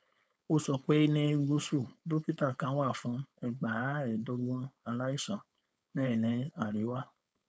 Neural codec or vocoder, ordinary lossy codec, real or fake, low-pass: codec, 16 kHz, 4.8 kbps, FACodec; none; fake; none